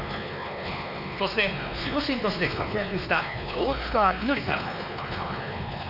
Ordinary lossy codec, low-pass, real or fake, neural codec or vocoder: none; 5.4 kHz; fake; codec, 16 kHz, 2 kbps, X-Codec, WavLM features, trained on Multilingual LibriSpeech